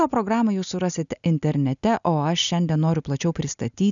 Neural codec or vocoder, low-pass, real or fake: none; 7.2 kHz; real